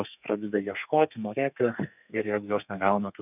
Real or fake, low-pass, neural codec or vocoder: fake; 3.6 kHz; codec, 32 kHz, 1.9 kbps, SNAC